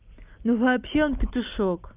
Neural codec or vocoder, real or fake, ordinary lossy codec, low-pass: none; real; Opus, 24 kbps; 3.6 kHz